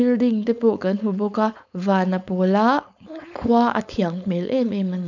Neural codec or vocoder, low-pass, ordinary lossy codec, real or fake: codec, 16 kHz, 4.8 kbps, FACodec; 7.2 kHz; none; fake